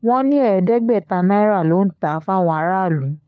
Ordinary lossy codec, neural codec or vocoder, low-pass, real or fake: none; codec, 16 kHz, 4 kbps, FunCodec, trained on LibriTTS, 50 frames a second; none; fake